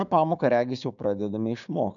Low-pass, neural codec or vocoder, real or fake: 7.2 kHz; codec, 16 kHz, 6 kbps, DAC; fake